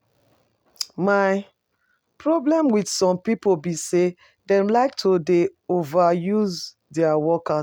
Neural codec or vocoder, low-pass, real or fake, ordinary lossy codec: none; 19.8 kHz; real; none